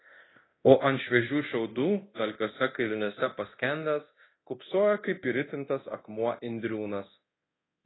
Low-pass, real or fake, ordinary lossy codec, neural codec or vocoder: 7.2 kHz; fake; AAC, 16 kbps; codec, 24 kHz, 0.9 kbps, DualCodec